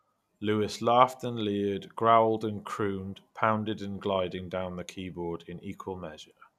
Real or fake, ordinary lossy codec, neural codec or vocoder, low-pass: real; none; none; 14.4 kHz